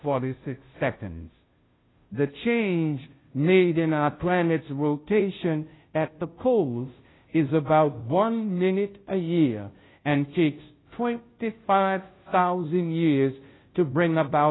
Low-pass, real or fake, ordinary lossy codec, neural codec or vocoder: 7.2 kHz; fake; AAC, 16 kbps; codec, 16 kHz, 0.5 kbps, FunCodec, trained on Chinese and English, 25 frames a second